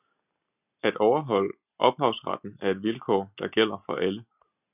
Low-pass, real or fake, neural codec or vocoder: 3.6 kHz; real; none